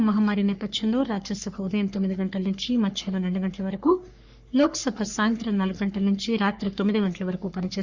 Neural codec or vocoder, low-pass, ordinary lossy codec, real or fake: codec, 44.1 kHz, 3.4 kbps, Pupu-Codec; 7.2 kHz; Opus, 64 kbps; fake